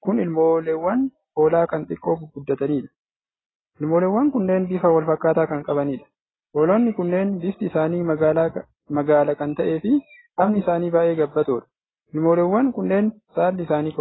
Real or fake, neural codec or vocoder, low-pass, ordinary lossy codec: real; none; 7.2 kHz; AAC, 16 kbps